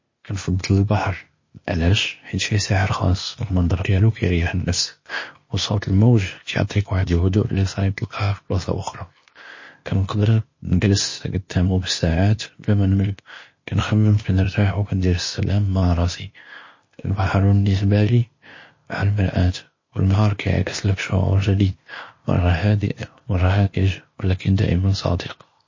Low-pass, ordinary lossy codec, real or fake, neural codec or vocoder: 7.2 kHz; MP3, 32 kbps; fake; codec, 16 kHz, 0.8 kbps, ZipCodec